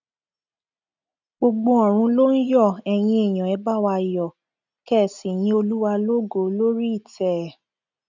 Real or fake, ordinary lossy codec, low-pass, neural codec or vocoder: real; none; 7.2 kHz; none